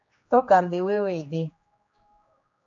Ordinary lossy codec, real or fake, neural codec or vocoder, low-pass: AAC, 48 kbps; fake; codec, 16 kHz, 2 kbps, X-Codec, HuBERT features, trained on general audio; 7.2 kHz